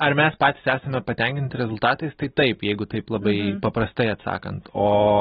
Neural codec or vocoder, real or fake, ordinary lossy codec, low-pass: none; real; AAC, 16 kbps; 10.8 kHz